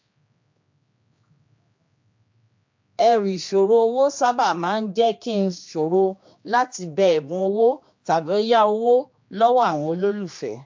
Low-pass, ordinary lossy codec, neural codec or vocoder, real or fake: 7.2 kHz; MP3, 48 kbps; codec, 16 kHz, 2 kbps, X-Codec, HuBERT features, trained on general audio; fake